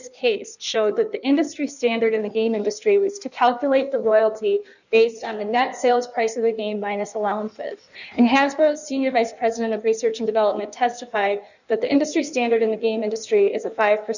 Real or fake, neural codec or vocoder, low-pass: fake; codec, 16 kHz in and 24 kHz out, 1.1 kbps, FireRedTTS-2 codec; 7.2 kHz